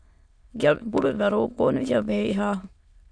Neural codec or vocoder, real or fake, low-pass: autoencoder, 22.05 kHz, a latent of 192 numbers a frame, VITS, trained on many speakers; fake; 9.9 kHz